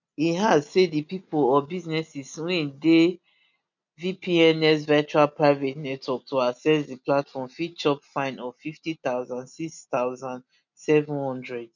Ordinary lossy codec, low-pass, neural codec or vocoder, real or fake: none; 7.2 kHz; none; real